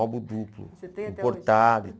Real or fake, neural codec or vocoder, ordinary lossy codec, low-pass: real; none; none; none